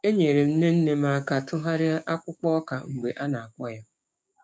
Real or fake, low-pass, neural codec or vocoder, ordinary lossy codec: fake; none; codec, 16 kHz, 6 kbps, DAC; none